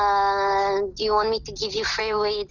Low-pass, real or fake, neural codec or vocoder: 7.2 kHz; real; none